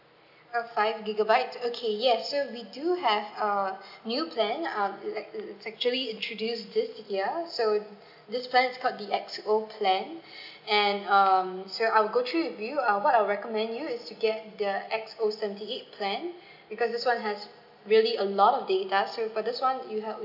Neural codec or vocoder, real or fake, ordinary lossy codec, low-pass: none; real; none; 5.4 kHz